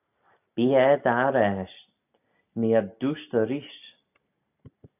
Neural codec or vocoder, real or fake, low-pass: none; real; 3.6 kHz